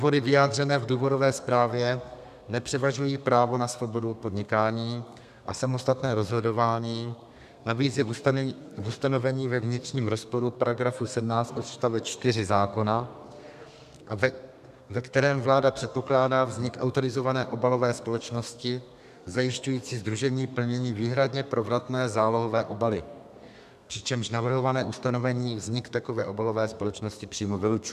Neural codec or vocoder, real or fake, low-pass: codec, 32 kHz, 1.9 kbps, SNAC; fake; 14.4 kHz